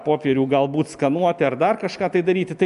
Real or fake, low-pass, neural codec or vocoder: real; 10.8 kHz; none